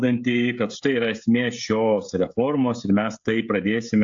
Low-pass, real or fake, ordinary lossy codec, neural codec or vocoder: 7.2 kHz; fake; AAC, 64 kbps; codec, 16 kHz, 16 kbps, FreqCodec, smaller model